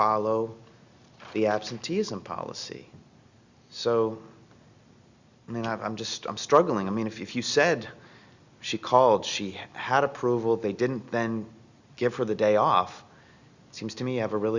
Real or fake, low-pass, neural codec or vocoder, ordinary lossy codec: real; 7.2 kHz; none; Opus, 64 kbps